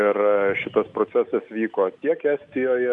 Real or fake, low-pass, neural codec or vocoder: real; 10.8 kHz; none